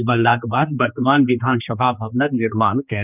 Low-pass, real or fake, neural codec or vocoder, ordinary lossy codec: 3.6 kHz; fake; codec, 16 kHz, 2 kbps, X-Codec, HuBERT features, trained on general audio; none